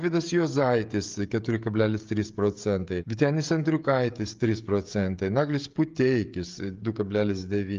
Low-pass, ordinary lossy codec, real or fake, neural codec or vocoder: 7.2 kHz; Opus, 32 kbps; fake; codec, 16 kHz, 16 kbps, FreqCodec, smaller model